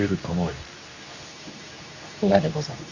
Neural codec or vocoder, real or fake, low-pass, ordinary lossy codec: codec, 24 kHz, 0.9 kbps, WavTokenizer, medium speech release version 2; fake; 7.2 kHz; Opus, 64 kbps